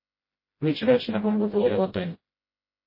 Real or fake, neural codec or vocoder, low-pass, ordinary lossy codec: fake; codec, 16 kHz, 0.5 kbps, FreqCodec, smaller model; 5.4 kHz; MP3, 24 kbps